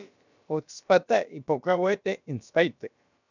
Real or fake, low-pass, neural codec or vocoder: fake; 7.2 kHz; codec, 16 kHz, about 1 kbps, DyCAST, with the encoder's durations